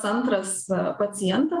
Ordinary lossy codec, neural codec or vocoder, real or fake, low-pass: Opus, 32 kbps; vocoder, 48 kHz, 128 mel bands, Vocos; fake; 10.8 kHz